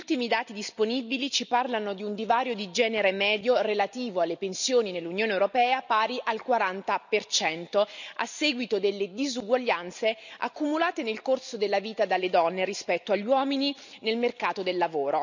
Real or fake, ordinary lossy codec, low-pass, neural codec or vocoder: real; none; 7.2 kHz; none